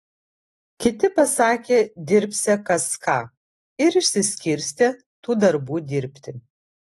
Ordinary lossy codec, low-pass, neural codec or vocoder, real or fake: AAC, 32 kbps; 19.8 kHz; none; real